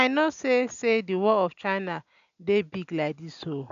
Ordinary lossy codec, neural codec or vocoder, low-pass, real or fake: none; none; 7.2 kHz; real